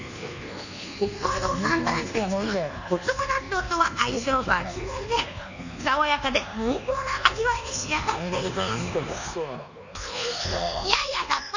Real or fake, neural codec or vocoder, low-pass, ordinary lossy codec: fake; codec, 24 kHz, 1.2 kbps, DualCodec; 7.2 kHz; none